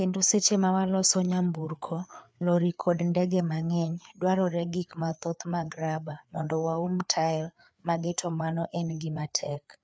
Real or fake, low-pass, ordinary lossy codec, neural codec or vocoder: fake; none; none; codec, 16 kHz, 4 kbps, FreqCodec, larger model